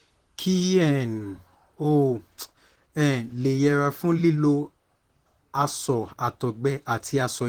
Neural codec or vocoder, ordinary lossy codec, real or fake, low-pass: vocoder, 44.1 kHz, 128 mel bands, Pupu-Vocoder; Opus, 16 kbps; fake; 19.8 kHz